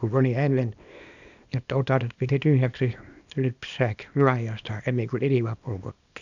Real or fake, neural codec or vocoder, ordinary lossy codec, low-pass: fake; codec, 24 kHz, 0.9 kbps, WavTokenizer, small release; none; 7.2 kHz